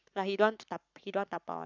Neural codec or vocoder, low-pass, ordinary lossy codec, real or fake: codec, 44.1 kHz, 7.8 kbps, Pupu-Codec; 7.2 kHz; none; fake